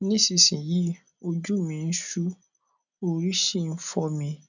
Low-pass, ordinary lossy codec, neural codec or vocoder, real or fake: 7.2 kHz; none; none; real